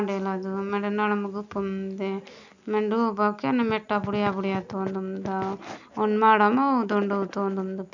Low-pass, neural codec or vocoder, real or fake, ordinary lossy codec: 7.2 kHz; none; real; none